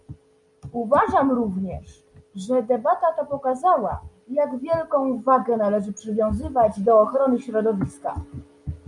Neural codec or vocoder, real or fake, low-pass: none; real; 10.8 kHz